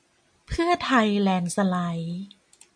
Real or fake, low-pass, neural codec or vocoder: real; 9.9 kHz; none